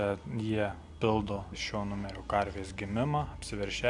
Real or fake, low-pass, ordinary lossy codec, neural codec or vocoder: real; 10.8 kHz; MP3, 96 kbps; none